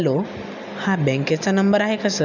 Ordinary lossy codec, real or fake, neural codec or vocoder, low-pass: none; real; none; 7.2 kHz